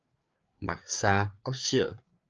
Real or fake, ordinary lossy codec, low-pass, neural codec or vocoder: fake; Opus, 24 kbps; 7.2 kHz; codec, 16 kHz, 4 kbps, FreqCodec, larger model